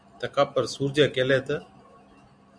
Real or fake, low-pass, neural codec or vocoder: real; 9.9 kHz; none